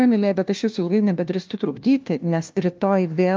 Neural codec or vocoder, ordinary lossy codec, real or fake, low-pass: codec, 16 kHz, 1 kbps, FunCodec, trained on LibriTTS, 50 frames a second; Opus, 24 kbps; fake; 7.2 kHz